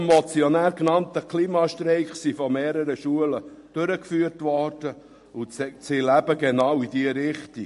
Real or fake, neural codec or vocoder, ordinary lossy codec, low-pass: real; none; MP3, 48 kbps; 14.4 kHz